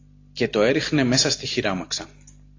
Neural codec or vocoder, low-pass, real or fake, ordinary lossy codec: none; 7.2 kHz; real; AAC, 32 kbps